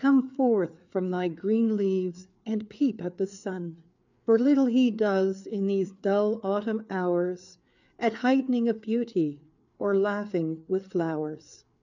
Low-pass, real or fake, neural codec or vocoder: 7.2 kHz; fake; codec, 16 kHz, 4 kbps, FreqCodec, larger model